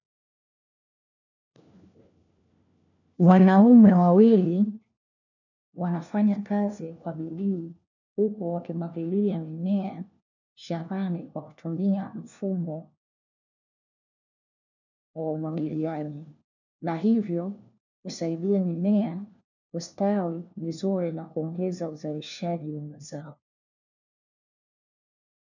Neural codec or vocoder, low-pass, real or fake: codec, 16 kHz, 1 kbps, FunCodec, trained on LibriTTS, 50 frames a second; 7.2 kHz; fake